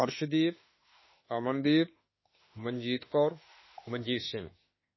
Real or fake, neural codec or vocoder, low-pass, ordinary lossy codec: fake; autoencoder, 48 kHz, 32 numbers a frame, DAC-VAE, trained on Japanese speech; 7.2 kHz; MP3, 24 kbps